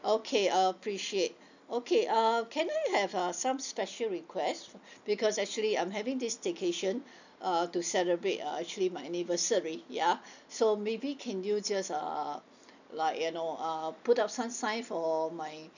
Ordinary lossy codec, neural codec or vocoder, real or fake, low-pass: none; none; real; 7.2 kHz